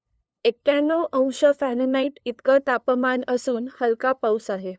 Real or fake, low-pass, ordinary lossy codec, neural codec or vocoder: fake; none; none; codec, 16 kHz, 2 kbps, FunCodec, trained on LibriTTS, 25 frames a second